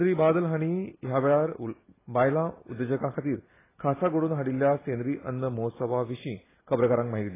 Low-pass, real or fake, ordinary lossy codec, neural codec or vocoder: 3.6 kHz; real; AAC, 16 kbps; none